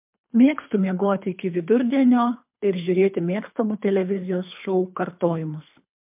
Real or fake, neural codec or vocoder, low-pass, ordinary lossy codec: fake; codec, 24 kHz, 3 kbps, HILCodec; 3.6 kHz; MP3, 32 kbps